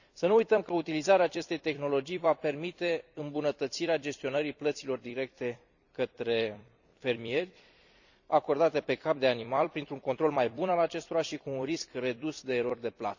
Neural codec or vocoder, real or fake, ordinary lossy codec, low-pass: vocoder, 44.1 kHz, 128 mel bands every 256 samples, BigVGAN v2; fake; none; 7.2 kHz